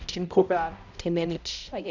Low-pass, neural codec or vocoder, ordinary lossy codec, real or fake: 7.2 kHz; codec, 16 kHz, 0.5 kbps, X-Codec, HuBERT features, trained on balanced general audio; none; fake